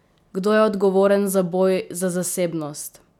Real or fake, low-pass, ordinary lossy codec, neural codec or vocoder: real; 19.8 kHz; none; none